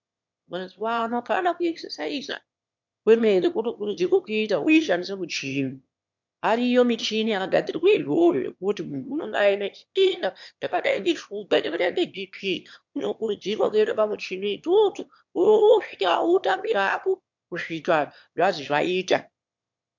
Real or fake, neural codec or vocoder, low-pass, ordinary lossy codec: fake; autoencoder, 22.05 kHz, a latent of 192 numbers a frame, VITS, trained on one speaker; 7.2 kHz; MP3, 64 kbps